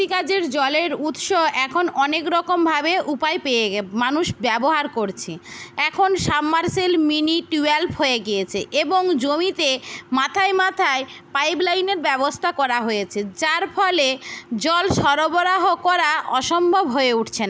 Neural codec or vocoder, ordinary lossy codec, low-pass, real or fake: none; none; none; real